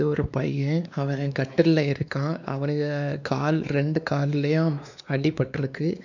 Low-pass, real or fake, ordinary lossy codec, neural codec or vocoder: 7.2 kHz; fake; none; codec, 16 kHz, 2 kbps, X-Codec, WavLM features, trained on Multilingual LibriSpeech